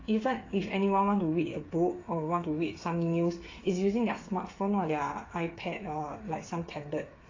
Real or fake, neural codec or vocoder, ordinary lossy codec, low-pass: fake; codec, 16 kHz, 8 kbps, FreqCodec, smaller model; AAC, 32 kbps; 7.2 kHz